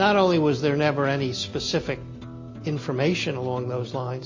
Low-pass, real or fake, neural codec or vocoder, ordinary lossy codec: 7.2 kHz; real; none; MP3, 32 kbps